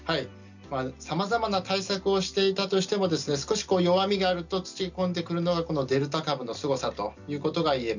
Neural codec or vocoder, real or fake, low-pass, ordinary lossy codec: none; real; 7.2 kHz; none